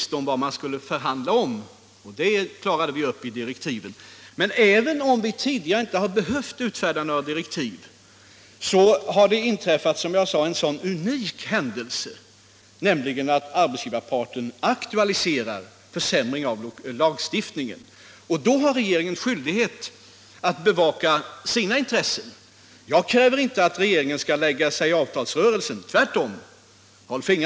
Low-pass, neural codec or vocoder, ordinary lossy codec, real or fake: none; none; none; real